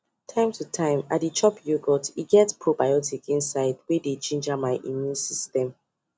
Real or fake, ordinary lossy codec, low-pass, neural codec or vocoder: real; none; none; none